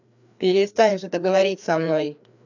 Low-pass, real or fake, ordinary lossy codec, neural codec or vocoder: 7.2 kHz; fake; none; codec, 16 kHz, 2 kbps, FreqCodec, larger model